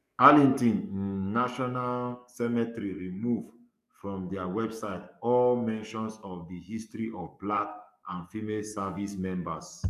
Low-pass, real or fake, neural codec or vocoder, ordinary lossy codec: 14.4 kHz; fake; codec, 44.1 kHz, 7.8 kbps, DAC; none